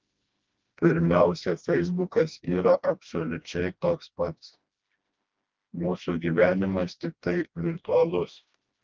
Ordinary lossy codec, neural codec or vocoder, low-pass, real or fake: Opus, 24 kbps; codec, 16 kHz, 1 kbps, FreqCodec, smaller model; 7.2 kHz; fake